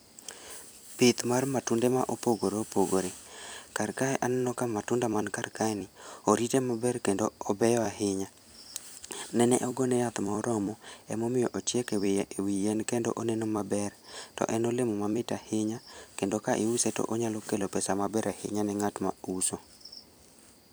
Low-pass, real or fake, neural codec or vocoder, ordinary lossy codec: none; fake; vocoder, 44.1 kHz, 128 mel bands every 256 samples, BigVGAN v2; none